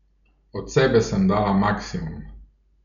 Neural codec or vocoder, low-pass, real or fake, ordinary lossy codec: none; 7.2 kHz; real; none